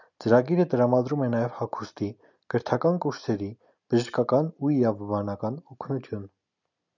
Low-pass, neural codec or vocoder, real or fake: 7.2 kHz; none; real